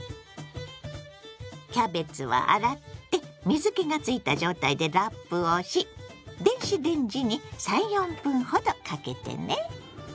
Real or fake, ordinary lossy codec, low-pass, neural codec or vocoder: real; none; none; none